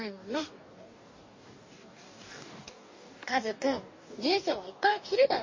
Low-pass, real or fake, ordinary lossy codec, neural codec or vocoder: 7.2 kHz; fake; MP3, 32 kbps; codec, 44.1 kHz, 2.6 kbps, DAC